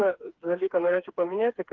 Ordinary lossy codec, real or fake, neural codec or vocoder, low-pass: Opus, 16 kbps; fake; codec, 16 kHz, 4 kbps, FreqCodec, smaller model; 7.2 kHz